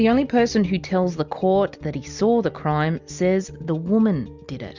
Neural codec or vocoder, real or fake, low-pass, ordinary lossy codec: none; real; 7.2 kHz; Opus, 64 kbps